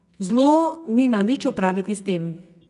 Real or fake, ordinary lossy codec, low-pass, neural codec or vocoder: fake; none; 10.8 kHz; codec, 24 kHz, 0.9 kbps, WavTokenizer, medium music audio release